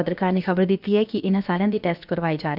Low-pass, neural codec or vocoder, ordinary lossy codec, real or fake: 5.4 kHz; codec, 16 kHz, about 1 kbps, DyCAST, with the encoder's durations; none; fake